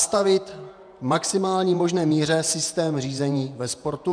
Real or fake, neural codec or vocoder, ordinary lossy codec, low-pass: fake; vocoder, 48 kHz, 128 mel bands, Vocos; MP3, 96 kbps; 9.9 kHz